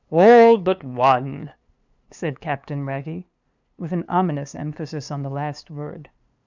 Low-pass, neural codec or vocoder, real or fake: 7.2 kHz; codec, 16 kHz, 2 kbps, FunCodec, trained on LibriTTS, 25 frames a second; fake